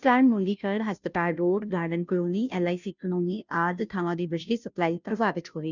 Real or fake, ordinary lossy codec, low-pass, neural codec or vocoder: fake; none; 7.2 kHz; codec, 16 kHz, 0.5 kbps, FunCodec, trained on Chinese and English, 25 frames a second